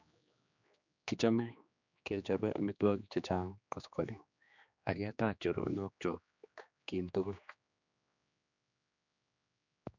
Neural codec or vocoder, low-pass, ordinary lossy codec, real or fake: codec, 16 kHz, 2 kbps, X-Codec, HuBERT features, trained on general audio; 7.2 kHz; none; fake